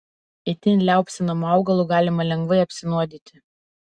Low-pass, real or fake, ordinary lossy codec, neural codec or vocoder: 9.9 kHz; real; Opus, 64 kbps; none